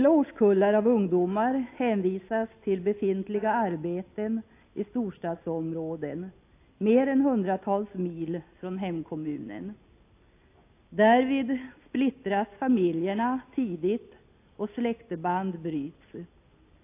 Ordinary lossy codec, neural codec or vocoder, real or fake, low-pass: AAC, 24 kbps; none; real; 3.6 kHz